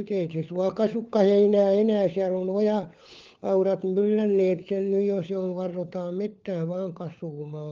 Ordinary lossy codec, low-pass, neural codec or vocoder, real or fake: Opus, 32 kbps; 7.2 kHz; codec, 16 kHz, 4 kbps, FunCodec, trained on LibriTTS, 50 frames a second; fake